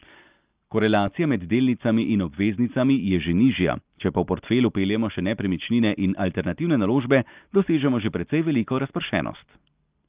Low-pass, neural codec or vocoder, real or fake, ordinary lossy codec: 3.6 kHz; none; real; Opus, 24 kbps